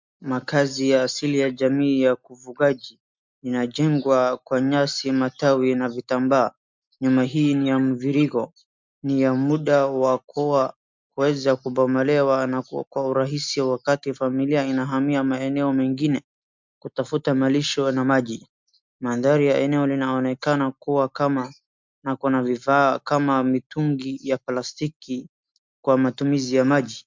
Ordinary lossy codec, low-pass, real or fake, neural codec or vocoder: MP3, 64 kbps; 7.2 kHz; real; none